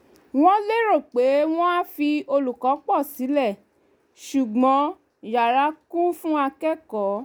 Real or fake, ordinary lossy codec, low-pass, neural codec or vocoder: real; none; none; none